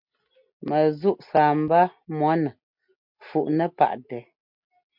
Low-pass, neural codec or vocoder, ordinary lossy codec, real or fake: 5.4 kHz; none; MP3, 48 kbps; real